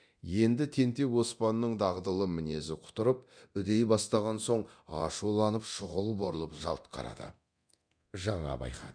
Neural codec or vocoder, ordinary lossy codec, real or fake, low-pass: codec, 24 kHz, 0.9 kbps, DualCodec; none; fake; 9.9 kHz